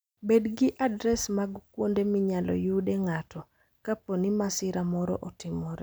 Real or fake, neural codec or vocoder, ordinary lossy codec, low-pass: real; none; none; none